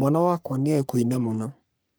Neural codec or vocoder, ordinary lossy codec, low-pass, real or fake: codec, 44.1 kHz, 3.4 kbps, Pupu-Codec; none; none; fake